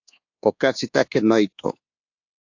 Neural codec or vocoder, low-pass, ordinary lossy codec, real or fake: codec, 24 kHz, 1.2 kbps, DualCodec; 7.2 kHz; AAC, 48 kbps; fake